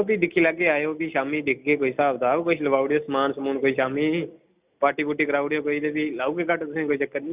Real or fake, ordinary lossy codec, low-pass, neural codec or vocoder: real; Opus, 64 kbps; 3.6 kHz; none